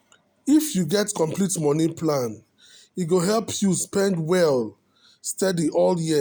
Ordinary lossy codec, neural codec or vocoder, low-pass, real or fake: none; none; none; real